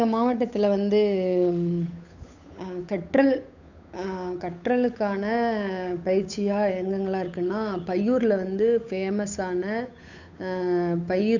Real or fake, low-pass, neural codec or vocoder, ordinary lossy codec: fake; 7.2 kHz; codec, 16 kHz, 8 kbps, FunCodec, trained on Chinese and English, 25 frames a second; none